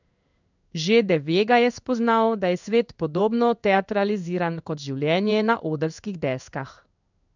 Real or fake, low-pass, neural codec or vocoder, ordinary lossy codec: fake; 7.2 kHz; codec, 16 kHz in and 24 kHz out, 1 kbps, XY-Tokenizer; none